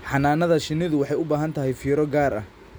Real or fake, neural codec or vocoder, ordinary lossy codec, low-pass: real; none; none; none